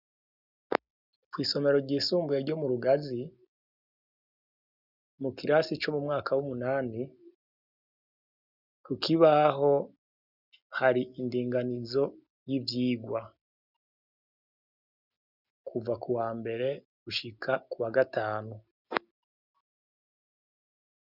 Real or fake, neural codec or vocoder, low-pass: real; none; 5.4 kHz